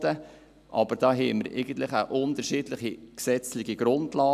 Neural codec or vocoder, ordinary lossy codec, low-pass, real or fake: none; none; 14.4 kHz; real